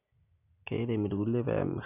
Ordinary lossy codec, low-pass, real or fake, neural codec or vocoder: none; 3.6 kHz; real; none